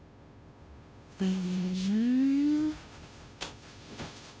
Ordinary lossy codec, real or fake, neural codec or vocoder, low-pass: none; fake; codec, 16 kHz, 0.5 kbps, FunCodec, trained on Chinese and English, 25 frames a second; none